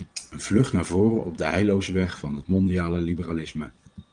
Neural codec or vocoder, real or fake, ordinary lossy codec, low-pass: vocoder, 22.05 kHz, 80 mel bands, Vocos; fake; Opus, 24 kbps; 9.9 kHz